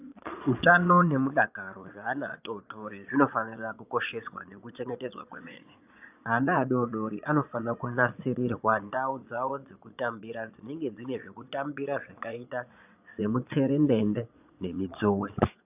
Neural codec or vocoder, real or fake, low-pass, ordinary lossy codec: vocoder, 22.05 kHz, 80 mel bands, Vocos; fake; 3.6 kHz; AAC, 32 kbps